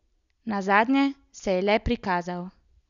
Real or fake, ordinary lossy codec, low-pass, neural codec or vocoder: real; none; 7.2 kHz; none